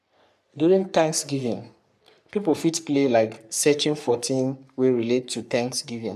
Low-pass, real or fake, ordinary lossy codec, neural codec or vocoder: 14.4 kHz; fake; none; codec, 44.1 kHz, 3.4 kbps, Pupu-Codec